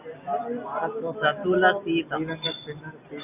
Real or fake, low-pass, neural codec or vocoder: real; 3.6 kHz; none